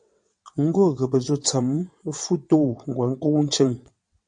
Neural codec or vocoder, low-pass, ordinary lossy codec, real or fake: vocoder, 22.05 kHz, 80 mel bands, WaveNeXt; 9.9 kHz; MP3, 48 kbps; fake